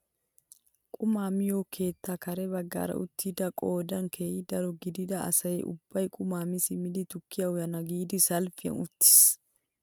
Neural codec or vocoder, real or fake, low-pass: none; real; 19.8 kHz